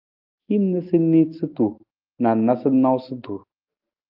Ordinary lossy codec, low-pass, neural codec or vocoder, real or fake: Opus, 24 kbps; 5.4 kHz; none; real